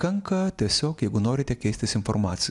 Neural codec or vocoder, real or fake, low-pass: none; real; 10.8 kHz